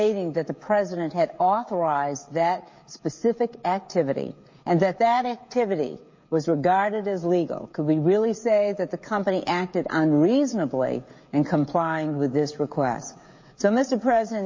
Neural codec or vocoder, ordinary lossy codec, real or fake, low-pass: codec, 16 kHz, 16 kbps, FreqCodec, smaller model; MP3, 32 kbps; fake; 7.2 kHz